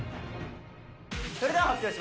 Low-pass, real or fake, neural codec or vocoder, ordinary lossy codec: none; real; none; none